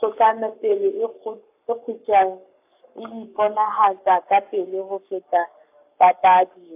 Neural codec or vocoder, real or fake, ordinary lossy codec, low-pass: codec, 44.1 kHz, 7.8 kbps, Pupu-Codec; fake; AAC, 32 kbps; 3.6 kHz